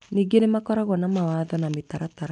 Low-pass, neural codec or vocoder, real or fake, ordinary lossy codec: 10.8 kHz; none; real; none